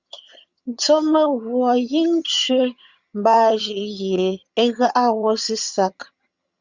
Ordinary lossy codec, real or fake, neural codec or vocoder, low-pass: Opus, 64 kbps; fake; vocoder, 22.05 kHz, 80 mel bands, HiFi-GAN; 7.2 kHz